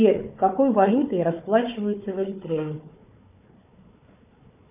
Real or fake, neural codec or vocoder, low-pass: fake; codec, 16 kHz, 4 kbps, FunCodec, trained on Chinese and English, 50 frames a second; 3.6 kHz